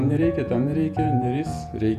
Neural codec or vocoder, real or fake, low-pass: vocoder, 48 kHz, 128 mel bands, Vocos; fake; 14.4 kHz